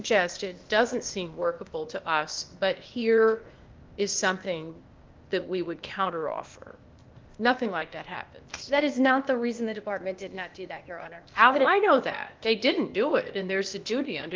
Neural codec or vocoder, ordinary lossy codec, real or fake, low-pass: codec, 16 kHz, 0.8 kbps, ZipCodec; Opus, 32 kbps; fake; 7.2 kHz